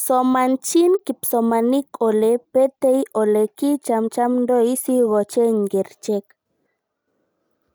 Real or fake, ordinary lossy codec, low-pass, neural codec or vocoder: real; none; none; none